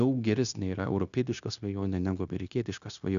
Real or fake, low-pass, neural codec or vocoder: fake; 7.2 kHz; codec, 16 kHz, 0.9 kbps, LongCat-Audio-Codec